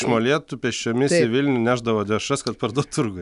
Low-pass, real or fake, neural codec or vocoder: 10.8 kHz; real; none